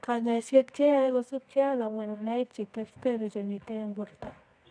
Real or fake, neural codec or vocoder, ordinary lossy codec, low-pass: fake; codec, 24 kHz, 0.9 kbps, WavTokenizer, medium music audio release; none; 9.9 kHz